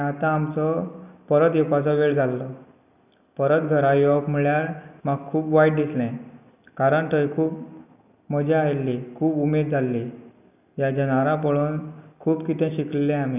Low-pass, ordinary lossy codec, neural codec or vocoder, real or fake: 3.6 kHz; none; none; real